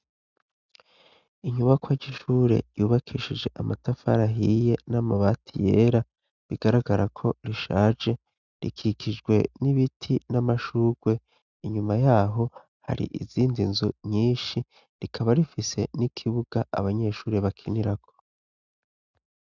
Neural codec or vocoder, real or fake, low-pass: none; real; 7.2 kHz